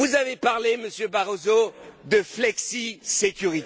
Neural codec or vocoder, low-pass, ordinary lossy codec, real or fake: none; none; none; real